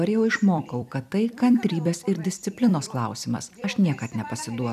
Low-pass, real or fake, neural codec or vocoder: 14.4 kHz; fake; vocoder, 44.1 kHz, 128 mel bands every 256 samples, BigVGAN v2